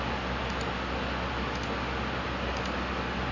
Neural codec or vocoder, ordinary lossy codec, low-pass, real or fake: none; MP3, 64 kbps; 7.2 kHz; real